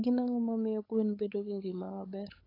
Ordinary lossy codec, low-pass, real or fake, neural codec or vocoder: AAC, 24 kbps; 5.4 kHz; fake; codec, 16 kHz, 8 kbps, FunCodec, trained on LibriTTS, 25 frames a second